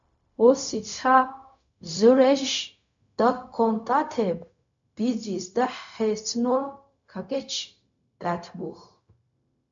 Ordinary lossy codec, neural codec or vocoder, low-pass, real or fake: MP3, 64 kbps; codec, 16 kHz, 0.4 kbps, LongCat-Audio-Codec; 7.2 kHz; fake